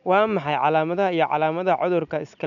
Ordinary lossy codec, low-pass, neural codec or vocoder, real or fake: none; 7.2 kHz; none; real